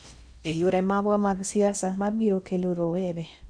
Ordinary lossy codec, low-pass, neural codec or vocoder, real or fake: none; 9.9 kHz; codec, 16 kHz in and 24 kHz out, 0.6 kbps, FocalCodec, streaming, 4096 codes; fake